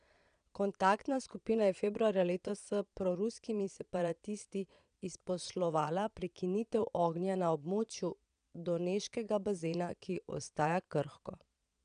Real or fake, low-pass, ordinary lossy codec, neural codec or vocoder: fake; 9.9 kHz; none; vocoder, 22.05 kHz, 80 mel bands, Vocos